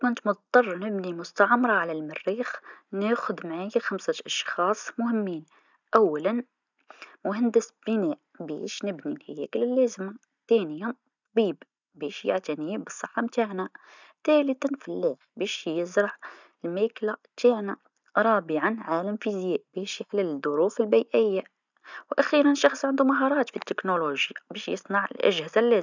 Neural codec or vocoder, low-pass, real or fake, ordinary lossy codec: none; 7.2 kHz; real; none